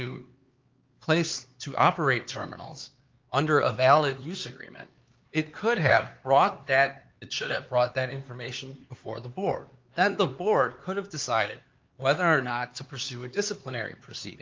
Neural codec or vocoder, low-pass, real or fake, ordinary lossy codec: codec, 16 kHz, 4 kbps, X-Codec, HuBERT features, trained on LibriSpeech; 7.2 kHz; fake; Opus, 32 kbps